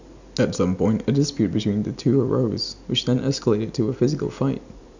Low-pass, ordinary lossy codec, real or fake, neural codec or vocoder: 7.2 kHz; none; real; none